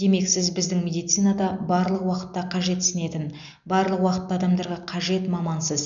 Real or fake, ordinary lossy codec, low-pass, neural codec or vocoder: real; none; 7.2 kHz; none